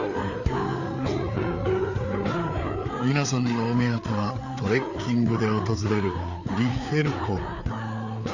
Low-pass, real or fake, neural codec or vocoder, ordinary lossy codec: 7.2 kHz; fake; codec, 16 kHz, 4 kbps, FreqCodec, larger model; none